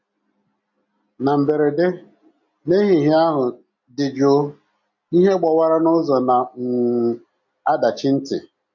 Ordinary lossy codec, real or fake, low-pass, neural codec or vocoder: MP3, 64 kbps; real; 7.2 kHz; none